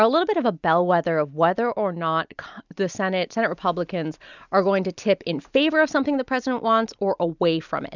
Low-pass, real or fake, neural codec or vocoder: 7.2 kHz; real; none